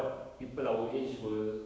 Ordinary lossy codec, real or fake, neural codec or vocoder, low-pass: none; fake; codec, 16 kHz, 6 kbps, DAC; none